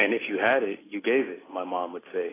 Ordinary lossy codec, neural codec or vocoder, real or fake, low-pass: AAC, 16 kbps; none; real; 3.6 kHz